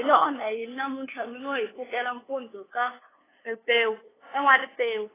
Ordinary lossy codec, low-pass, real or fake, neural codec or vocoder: AAC, 16 kbps; 3.6 kHz; fake; codec, 16 kHz, 2 kbps, FunCodec, trained on LibriTTS, 25 frames a second